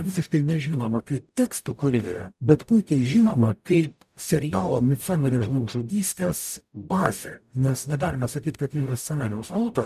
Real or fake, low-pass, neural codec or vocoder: fake; 14.4 kHz; codec, 44.1 kHz, 0.9 kbps, DAC